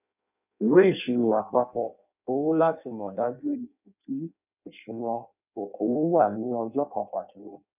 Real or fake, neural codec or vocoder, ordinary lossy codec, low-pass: fake; codec, 16 kHz in and 24 kHz out, 0.6 kbps, FireRedTTS-2 codec; none; 3.6 kHz